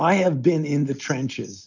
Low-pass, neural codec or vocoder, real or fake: 7.2 kHz; none; real